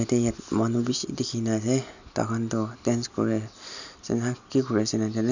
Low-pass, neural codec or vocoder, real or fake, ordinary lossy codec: 7.2 kHz; none; real; none